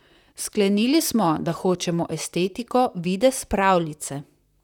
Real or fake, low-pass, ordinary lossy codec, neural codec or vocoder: fake; 19.8 kHz; none; vocoder, 44.1 kHz, 128 mel bands, Pupu-Vocoder